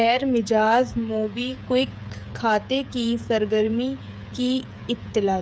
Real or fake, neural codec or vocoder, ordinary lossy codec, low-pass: fake; codec, 16 kHz, 8 kbps, FreqCodec, smaller model; none; none